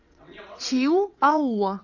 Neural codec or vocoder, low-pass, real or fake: vocoder, 22.05 kHz, 80 mel bands, WaveNeXt; 7.2 kHz; fake